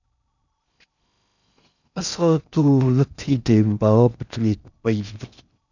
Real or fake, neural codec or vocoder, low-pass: fake; codec, 16 kHz in and 24 kHz out, 0.6 kbps, FocalCodec, streaming, 2048 codes; 7.2 kHz